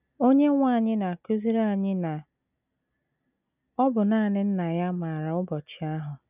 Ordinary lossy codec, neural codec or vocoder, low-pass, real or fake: none; none; 3.6 kHz; real